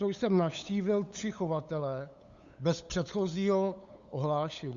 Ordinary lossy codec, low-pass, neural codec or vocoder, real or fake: Opus, 64 kbps; 7.2 kHz; codec, 16 kHz, 8 kbps, FunCodec, trained on LibriTTS, 25 frames a second; fake